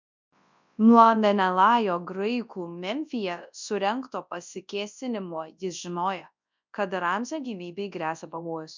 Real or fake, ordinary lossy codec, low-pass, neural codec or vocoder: fake; MP3, 64 kbps; 7.2 kHz; codec, 24 kHz, 0.9 kbps, WavTokenizer, large speech release